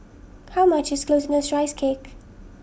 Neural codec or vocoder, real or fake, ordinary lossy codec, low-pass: none; real; none; none